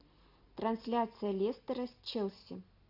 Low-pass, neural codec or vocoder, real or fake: 5.4 kHz; none; real